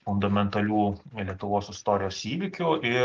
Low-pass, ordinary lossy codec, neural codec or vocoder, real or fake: 7.2 kHz; Opus, 32 kbps; none; real